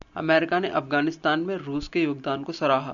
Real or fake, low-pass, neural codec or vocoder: real; 7.2 kHz; none